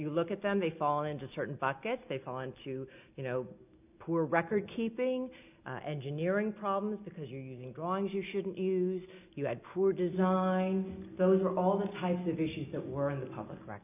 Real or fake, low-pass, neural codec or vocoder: real; 3.6 kHz; none